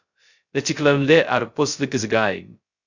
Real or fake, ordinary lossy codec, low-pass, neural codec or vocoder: fake; Opus, 64 kbps; 7.2 kHz; codec, 16 kHz, 0.2 kbps, FocalCodec